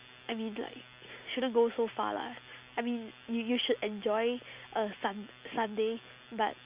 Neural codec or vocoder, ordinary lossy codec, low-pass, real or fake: none; Opus, 64 kbps; 3.6 kHz; real